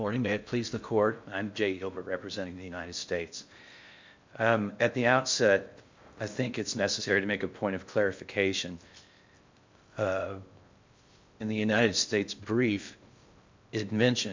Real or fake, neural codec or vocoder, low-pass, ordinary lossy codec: fake; codec, 16 kHz in and 24 kHz out, 0.6 kbps, FocalCodec, streaming, 4096 codes; 7.2 kHz; MP3, 64 kbps